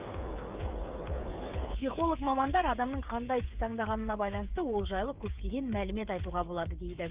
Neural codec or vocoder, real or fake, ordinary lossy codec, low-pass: codec, 16 kHz, 8 kbps, FreqCodec, smaller model; fake; Opus, 32 kbps; 3.6 kHz